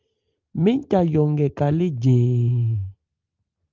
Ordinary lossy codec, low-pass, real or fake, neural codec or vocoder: Opus, 24 kbps; 7.2 kHz; real; none